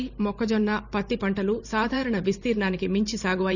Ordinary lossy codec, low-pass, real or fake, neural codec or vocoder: none; 7.2 kHz; fake; vocoder, 44.1 kHz, 128 mel bands every 512 samples, BigVGAN v2